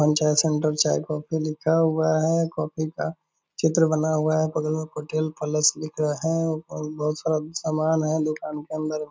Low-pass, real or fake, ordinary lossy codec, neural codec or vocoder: none; real; none; none